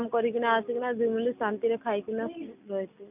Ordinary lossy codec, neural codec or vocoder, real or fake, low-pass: none; none; real; 3.6 kHz